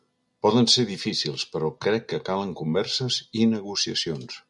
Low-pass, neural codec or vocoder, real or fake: 10.8 kHz; none; real